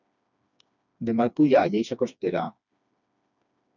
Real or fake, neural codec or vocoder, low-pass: fake; codec, 16 kHz, 2 kbps, FreqCodec, smaller model; 7.2 kHz